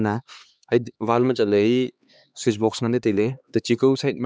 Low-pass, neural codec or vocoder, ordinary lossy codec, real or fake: none; codec, 16 kHz, 2 kbps, X-Codec, HuBERT features, trained on LibriSpeech; none; fake